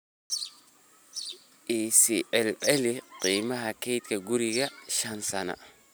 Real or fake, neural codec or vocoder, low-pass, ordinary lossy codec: real; none; none; none